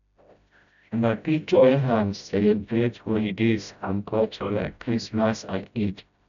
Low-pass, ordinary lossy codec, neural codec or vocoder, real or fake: 7.2 kHz; none; codec, 16 kHz, 0.5 kbps, FreqCodec, smaller model; fake